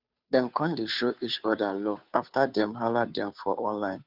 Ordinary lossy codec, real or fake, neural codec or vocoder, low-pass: none; fake; codec, 16 kHz, 2 kbps, FunCodec, trained on Chinese and English, 25 frames a second; 5.4 kHz